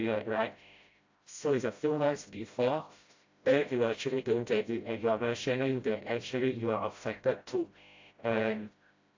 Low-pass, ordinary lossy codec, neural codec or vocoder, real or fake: 7.2 kHz; AAC, 48 kbps; codec, 16 kHz, 0.5 kbps, FreqCodec, smaller model; fake